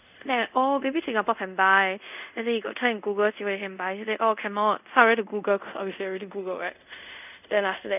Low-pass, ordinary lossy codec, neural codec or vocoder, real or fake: 3.6 kHz; none; codec, 24 kHz, 0.5 kbps, DualCodec; fake